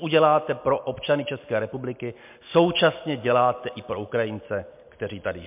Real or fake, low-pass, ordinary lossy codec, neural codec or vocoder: real; 3.6 kHz; MP3, 32 kbps; none